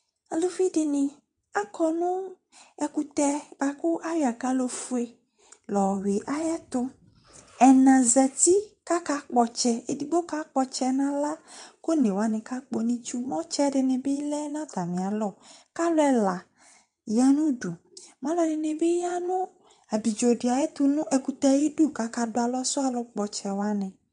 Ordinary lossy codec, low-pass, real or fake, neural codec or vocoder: MP3, 64 kbps; 9.9 kHz; fake; vocoder, 22.05 kHz, 80 mel bands, WaveNeXt